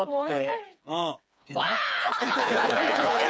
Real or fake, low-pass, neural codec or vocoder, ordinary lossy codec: fake; none; codec, 16 kHz, 4 kbps, FreqCodec, smaller model; none